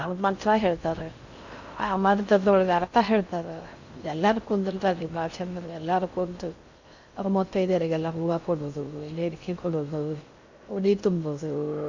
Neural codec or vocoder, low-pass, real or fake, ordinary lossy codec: codec, 16 kHz in and 24 kHz out, 0.6 kbps, FocalCodec, streaming, 4096 codes; 7.2 kHz; fake; Opus, 64 kbps